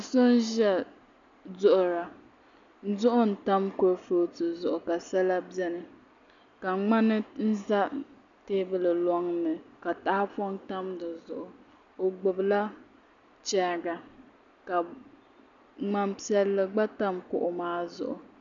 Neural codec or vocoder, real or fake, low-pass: none; real; 7.2 kHz